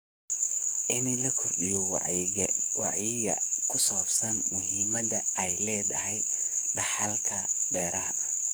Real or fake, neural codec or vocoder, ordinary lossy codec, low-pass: fake; codec, 44.1 kHz, 7.8 kbps, DAC; none; none